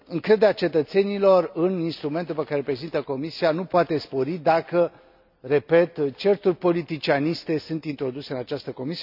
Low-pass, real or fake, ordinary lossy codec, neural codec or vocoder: 5.4 kHz; real; none; none